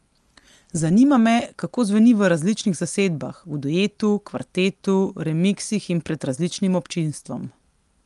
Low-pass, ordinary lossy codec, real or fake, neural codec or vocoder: 10.8 kHz; Opus, 32 kbps; real; none